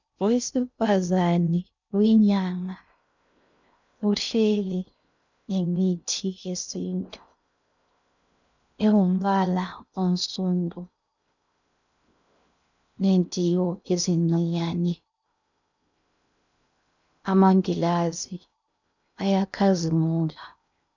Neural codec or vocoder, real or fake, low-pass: codec, 16 kHz in and 24 kHz out, 0.6 kbps, FocalCodec, streaming, 2048 codes; fake; 7.2 kHz